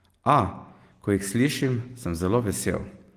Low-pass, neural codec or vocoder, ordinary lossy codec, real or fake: 14.4 kHz; none; Opus, 24 kbps; real